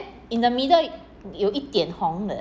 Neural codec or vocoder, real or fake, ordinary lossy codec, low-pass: none; real; none; none